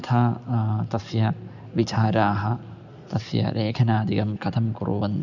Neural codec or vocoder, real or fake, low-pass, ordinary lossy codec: none; real; 7.2 kHz; none